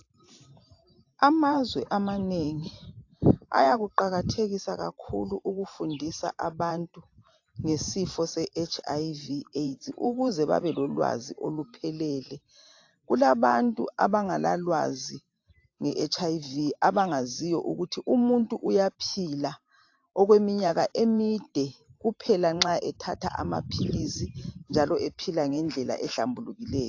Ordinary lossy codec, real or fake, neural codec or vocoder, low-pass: AAC, 48 kbps; fake; vocoder, 44.1 kHz, 128 mel bands every 512 samples, BigVGAN v2; 7.2 kHz